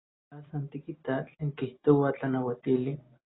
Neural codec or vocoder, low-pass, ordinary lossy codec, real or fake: none; 7.2 kHz; AAC, 16 kbps; real